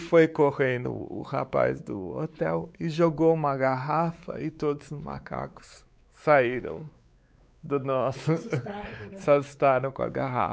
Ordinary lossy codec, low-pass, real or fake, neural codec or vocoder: none; none; fake; codec, 16 kHz, 4 kbps, X-Codec, WavLM features, trained on Multilingual LibriSpeech